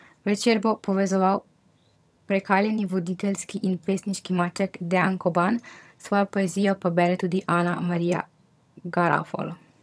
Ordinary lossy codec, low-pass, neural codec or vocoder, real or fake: none; none; vocoder, 22.05 kHz, 80 mel bands, HiFi-GAN; fake